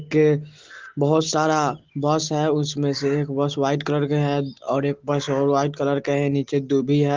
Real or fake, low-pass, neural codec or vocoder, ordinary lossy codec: real; 7.2 kHz; none; Opus, 16 kbps